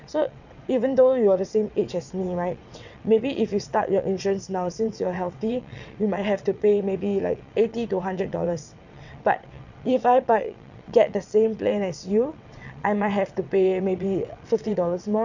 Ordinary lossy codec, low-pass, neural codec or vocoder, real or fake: none; 7.2 kHz; vocoder, 22.05 kHz, 80 mel bands, WaveNeXt; fake